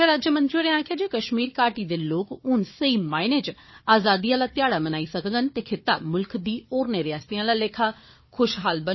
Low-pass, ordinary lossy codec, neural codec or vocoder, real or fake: 7.2 kHz; MP3, 24 kbps; codec, 16 kHz, 4 kbps, FunCodec, trained on Chinese and English, 50 frames a second; fake